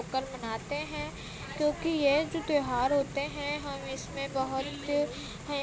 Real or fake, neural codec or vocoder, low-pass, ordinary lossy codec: real; none; none; none